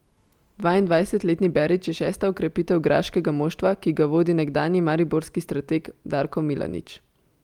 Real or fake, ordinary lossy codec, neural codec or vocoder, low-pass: real; Opus, 32 kbps; none; 19.8 kHz